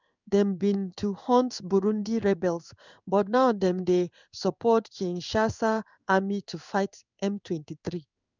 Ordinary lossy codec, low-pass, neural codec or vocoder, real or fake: none; 7.2 kHz; codec, 16 kHz in and 24 kHz out, 1 kbps, XY-Tokenizer; fake